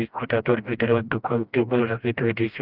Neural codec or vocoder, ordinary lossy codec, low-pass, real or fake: codec, 16 kHz, 1 kbps, FreqCodec, smaller model; Opus, 32 kbps; 5.4 kHz; fake